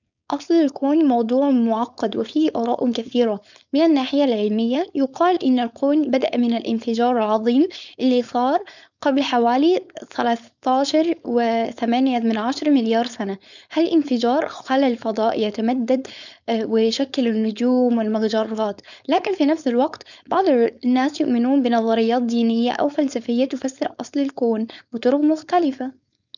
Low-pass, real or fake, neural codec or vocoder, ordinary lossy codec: 7.2 kHz; fake; codec, 16 kHz, 4.8 kbps, FACodec; none